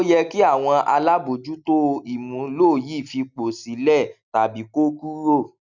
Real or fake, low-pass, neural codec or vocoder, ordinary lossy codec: real; 7.2 kHz; none; none